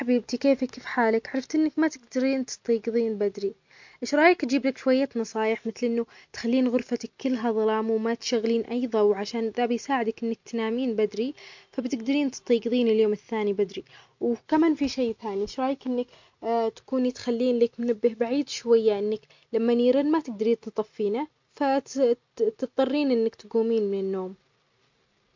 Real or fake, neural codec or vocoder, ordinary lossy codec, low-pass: real; none; MP3, 48 kbps; 7.2 kHz